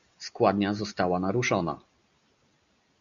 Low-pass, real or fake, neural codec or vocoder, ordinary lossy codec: 7.2 kHz; real; none; MP3, 96 kbps